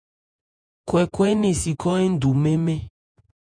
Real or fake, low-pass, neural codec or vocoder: fake; 9.9 kHz; vocoder, 48 kHz, 128 mel bands, Vocos